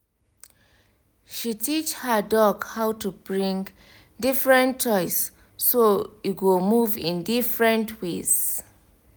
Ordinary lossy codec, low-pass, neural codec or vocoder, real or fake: none; none; none; real